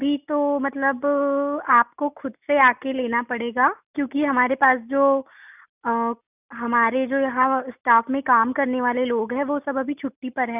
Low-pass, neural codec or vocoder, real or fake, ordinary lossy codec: 3.6 kHz; none; real; none